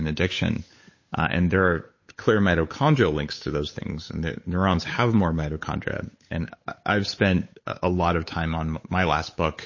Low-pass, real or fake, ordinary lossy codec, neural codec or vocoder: 7.2 kHz; fake; MP3, 32 kbps; codec, 16 kHz, 8 kbps, FunCodec, trained on Chinese and English, 25 frames a second